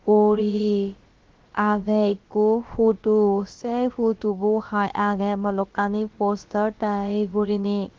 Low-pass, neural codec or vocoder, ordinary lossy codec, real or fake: 7.2 kHz; codec, 16 kHz, about 1 kbps, DyCAST, with the encoder's durations; Opus, 16 kbps; fake